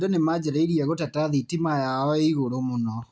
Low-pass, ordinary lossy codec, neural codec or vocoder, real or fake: none; none; none; real